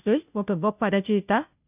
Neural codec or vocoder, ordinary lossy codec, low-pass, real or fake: codec, 16 kHz, 0.5 kbps, FunCodec, trained on Chinese and English, 25 frames a second; none; 3.6 kHz; fake